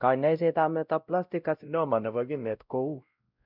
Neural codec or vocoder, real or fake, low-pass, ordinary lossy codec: codec, 16 kHz, 0.5 kbps, X-Codec, WavLM features, trained on Multilingual LibriSpeech; fake; 5.4 kHz; none